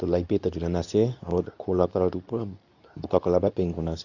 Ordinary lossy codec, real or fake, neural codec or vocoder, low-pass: none; fake; codec, 24 kHz, 0.9 kbps, WavTokenizer, medium speech release version 2; 7.2 kHz